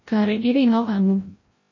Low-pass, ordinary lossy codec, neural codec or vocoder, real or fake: 7.2 kHz; MP3, 32 kbps; codec, 16 kHz, 0.5 kbps, FreqCodec, larger model; fake